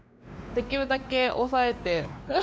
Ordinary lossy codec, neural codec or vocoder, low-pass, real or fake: none; codec, 16 kHz, 2 kbps, X-Codec, WavLM features, trained on Multilingual LibriSpeech; none; fake